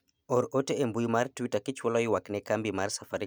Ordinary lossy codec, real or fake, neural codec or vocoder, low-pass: none; real; none; none